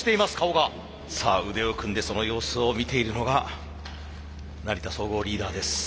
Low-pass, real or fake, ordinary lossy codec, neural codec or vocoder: none; real; none; none